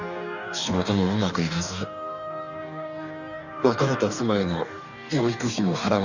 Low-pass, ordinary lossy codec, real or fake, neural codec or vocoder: 7.2 kHz; none; fake; codec, 44.1 kHz, 2.6 kbps, DAC